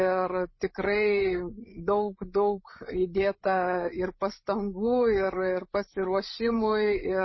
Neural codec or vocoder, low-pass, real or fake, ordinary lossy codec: codec, 16 kHz, 8 kbps, FreqCodec, larger model; 7.2 kHz; fake; MP3, 24 kbps